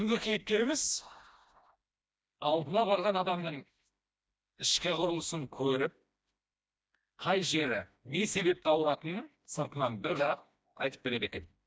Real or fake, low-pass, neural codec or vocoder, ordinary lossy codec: fake; none; codec, 16 kHz, 1 kbps, FreqCodec, smaller model; none